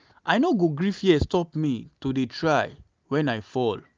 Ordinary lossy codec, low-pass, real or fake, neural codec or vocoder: Opus, 24 kbps; 7.2 kHz; real; none